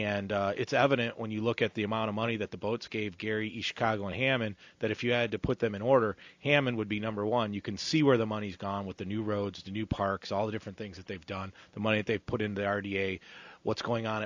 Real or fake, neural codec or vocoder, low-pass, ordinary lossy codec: real; none; 7.2 kHz; AAC, 48 kbps